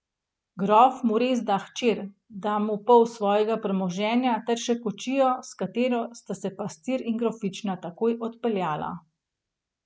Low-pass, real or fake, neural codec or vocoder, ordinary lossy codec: none; real; none; none